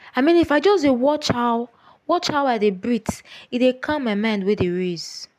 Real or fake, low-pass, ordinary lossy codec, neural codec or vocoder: real; 14.4 kHz; AAC, 96 kbps; none